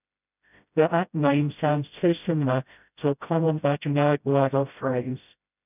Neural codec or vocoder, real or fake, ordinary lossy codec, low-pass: codec, 16 kHz, 0.5 kbps, FreqCodec, smaller model; fake; none; 3.6 kHz